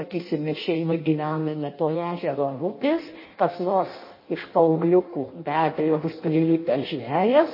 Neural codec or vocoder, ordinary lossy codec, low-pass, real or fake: codec, 16 kHz in and 24 kHz out, 0.6 kbps, FireRedTTS-2 codec; MP3, 24 kbps; 5.4 kHz; fake